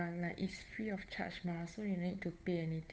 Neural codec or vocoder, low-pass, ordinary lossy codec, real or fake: codec, 16 kHz, 8 kbps, FunCodec, trained on Chinese and English, 25 frames a second; none; none; fake